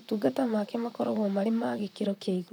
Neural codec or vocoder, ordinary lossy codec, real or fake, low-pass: vocoder, 44.1 kHz, 128 mel bands, Pupu-Vocoder; none; fake; 19.8 kHz